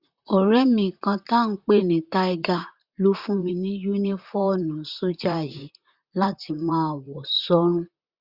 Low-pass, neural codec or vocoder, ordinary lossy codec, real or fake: 5.4 kHz; vocoder, 44.1 kHz, 128 mel bands, Pupu-Vocoder; Opus, 64 kbps; fake